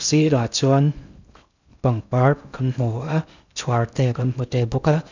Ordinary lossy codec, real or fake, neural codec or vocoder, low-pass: none; fake; codec, 16 kHz in and 24 kHz out, 0.6 kbps, FocalCodec, streaming, 2048 codes; 7.2 kHz